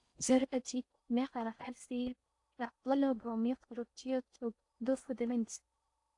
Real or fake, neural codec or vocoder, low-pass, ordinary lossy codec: fake; codec, 16 kHz in and 24 kHz out, 0.6 kbps, FocalCodec, streaming, 4096 codes; 10.8 kHz; AAC, 64 kbps